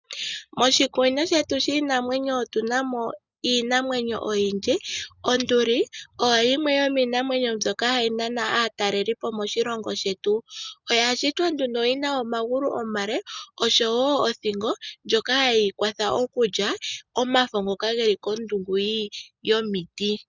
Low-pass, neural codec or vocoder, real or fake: 7.2 kHz; none; real